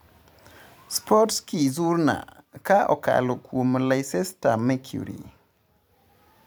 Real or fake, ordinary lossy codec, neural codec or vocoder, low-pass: fake; none; vocoder, 44.1 kHz, 128 mel bands every 256 samples, BigVGAN v2; none